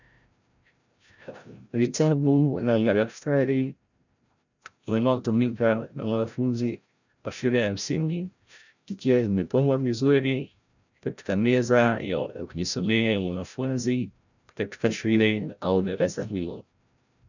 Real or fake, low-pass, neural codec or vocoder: fake; 7.2 kHz; codec, 16 kHz, 0.5 kbps, FreqCodec, larger model